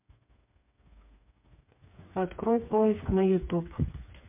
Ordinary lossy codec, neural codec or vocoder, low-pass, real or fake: MP3, 32 kbps; codec, 16 kHz, 4 kbps, FreqCodec, smaller model; 3.6 kHz; fake